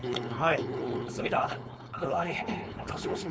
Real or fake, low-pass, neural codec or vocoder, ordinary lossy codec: fake; none; codec, 16 kHz, 4.8 kbps, FACodec; none